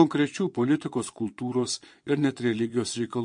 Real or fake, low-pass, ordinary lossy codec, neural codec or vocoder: fake; 9.9 kHz; MP3, 48 kbps; vocoder, 22.05 kHz, 80 mel bands, Vocos